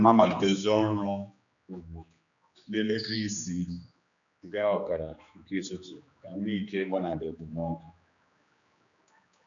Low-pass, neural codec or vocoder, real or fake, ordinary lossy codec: 7.2 kHz; codec, 16 kHz, 2 kbps, X-Codec, HuBERT features, trained on general audio; fake; none